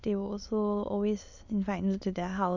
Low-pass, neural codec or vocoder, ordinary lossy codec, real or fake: 7.2 kHz; autoencoder, 22.05 kHz, a latent of 192 numbers a frame, VITS, trained on many speakers; none; fake